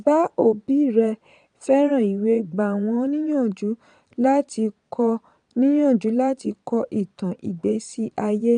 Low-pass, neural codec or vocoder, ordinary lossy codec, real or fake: 9.9 kHz; vocoder, 22.05 kHz, 80 mel bands, Vocos; none; fake